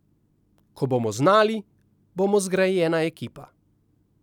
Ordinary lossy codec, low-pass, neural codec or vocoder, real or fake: none; 19.8 kHz; none; real